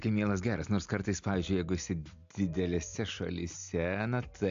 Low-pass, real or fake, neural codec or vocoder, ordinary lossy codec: 7.2 kHz; real; none; AAC, 64 kbps